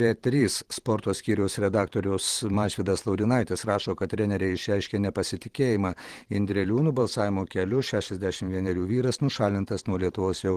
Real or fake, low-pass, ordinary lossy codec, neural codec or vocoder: fake; 14.4 kHz; Opus, 16 kbps; vocoder, 44.1 kHz, 128 mel bands every 512 samples, BigVGAN v2